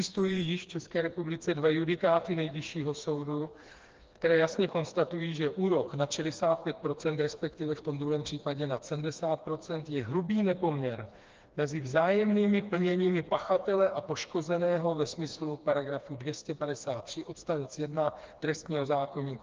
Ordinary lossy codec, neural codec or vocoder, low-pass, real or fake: Opus, 24 kbps; codec, 16 kHz, 2 kbps, FreqCodec, smaller model; 7.2 kHz; fake